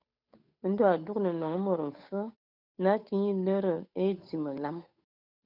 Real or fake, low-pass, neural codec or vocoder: fake; 5.4 kHz; codec, 16 kHz, 8 kbps, FunCodec, trained on Chinese and English, 25 frames a second